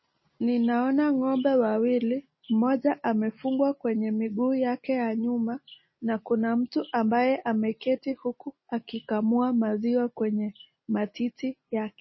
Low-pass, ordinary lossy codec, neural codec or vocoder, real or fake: 7.2 kHz; MP3, 24 kbps; none; real